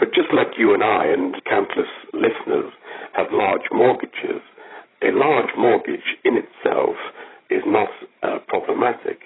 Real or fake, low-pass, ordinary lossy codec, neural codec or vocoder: fake; 7.2 kHz; AAC, 16 kbps; codec, 16 kHz, 16 kbps, FreqCodec, larger model